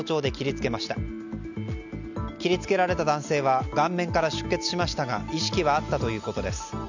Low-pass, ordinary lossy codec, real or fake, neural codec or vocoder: 7.2 kHz; none; real; none